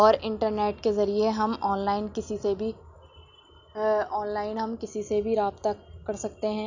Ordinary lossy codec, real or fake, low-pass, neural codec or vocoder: none; real; 7.2 kHz; none